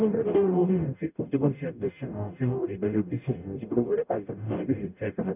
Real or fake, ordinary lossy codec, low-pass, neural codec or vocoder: fake; none; 3.6 kHz; codec, 44.1 kHz, 0.9 kbps, DAC